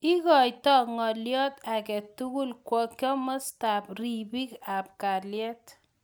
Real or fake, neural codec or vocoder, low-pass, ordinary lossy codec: real; none; none; none